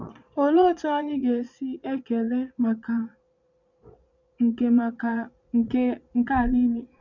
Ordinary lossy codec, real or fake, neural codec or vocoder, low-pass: none; fake; vocoder, 22.05 kHz, 80 mel bands, Vocos; 7.2 kHz